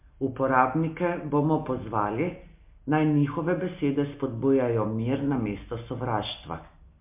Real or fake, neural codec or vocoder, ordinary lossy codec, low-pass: real; none; MP3, 24 kbps; 3.6 kHz